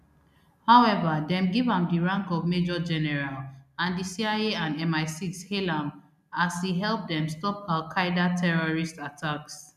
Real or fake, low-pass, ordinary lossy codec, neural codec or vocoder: real; 14.4 kHz; none; none